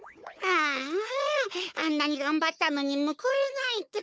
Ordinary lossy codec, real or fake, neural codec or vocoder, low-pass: none; fake; codec, 16 kHz, 4 kbps, FunCodec, trained on Chinese and English, 50 frames a second; none